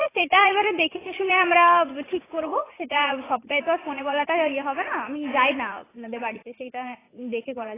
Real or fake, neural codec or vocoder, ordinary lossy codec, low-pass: fake; vocoder, 44.1 kHz, 80 mel bands, Vocos; AAC, 16 kbps; 3.6 kHz